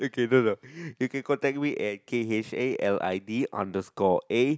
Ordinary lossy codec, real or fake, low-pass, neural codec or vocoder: none; real; none; none